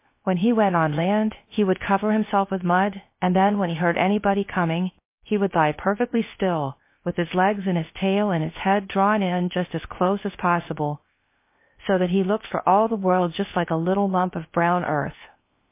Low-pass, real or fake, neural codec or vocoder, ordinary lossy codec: 3.6 kHz; fake; codec, 16 kHz, 0.7 kbps, FocalCodec; MP3, 24 kbps